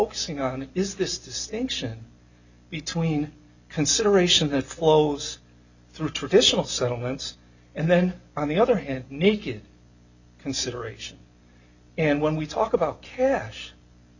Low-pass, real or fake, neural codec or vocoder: 7.2 kHz; real; none